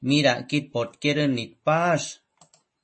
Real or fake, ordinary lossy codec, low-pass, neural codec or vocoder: real; MP3, 32 kbps; 9.9 kHz; none